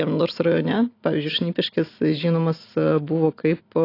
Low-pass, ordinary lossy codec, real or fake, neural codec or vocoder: 5.4 kHz; AAC, 32 kbps; real; none